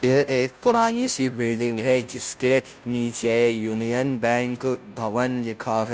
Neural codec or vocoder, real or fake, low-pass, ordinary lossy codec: codec, 16 kHz, 0.5 kbps, FunCodec, trained on Chinese and English, 25 frames a second; fake; none; none